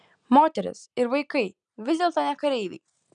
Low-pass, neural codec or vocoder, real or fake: 9.9 kHz; none; real